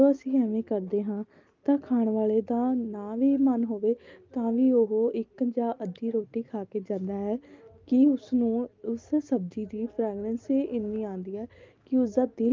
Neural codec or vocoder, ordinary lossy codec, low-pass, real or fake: none; Opus, 24 kbps; 7.2 kHz; real